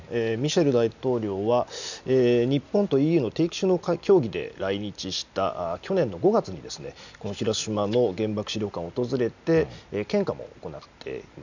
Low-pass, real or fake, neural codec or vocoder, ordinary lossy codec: 7.2 kHz; real; none; none